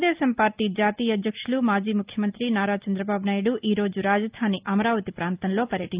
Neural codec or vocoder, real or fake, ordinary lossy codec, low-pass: none; real; Opus, 32 kbps; 3.6 kHz